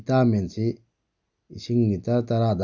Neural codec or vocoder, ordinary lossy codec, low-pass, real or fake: none; none; 7.2 kHz; real